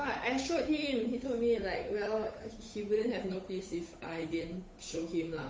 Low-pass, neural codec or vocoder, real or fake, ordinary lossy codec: none; codec, 16 kHz, 8 kbps, FunCodec, trained on Chinese and English, 25 frames a second; fake; none